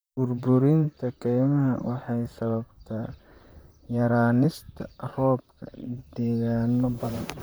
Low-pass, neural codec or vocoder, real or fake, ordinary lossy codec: none; vocoder, 44.1 kHz, 128 mel bands, Pupu-Vocoder; fake; none